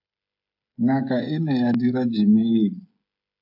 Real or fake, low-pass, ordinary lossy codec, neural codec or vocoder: fake; 5.4 kHz; AAC, 48 kbps; codec, 16 kHz, 16 kbps, FreqCodec, smaller model